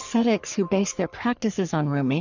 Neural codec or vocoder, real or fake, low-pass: codec, 44.1 kHz, 3.4 kbps, Pupu-Codec; fake; 7.2 kHz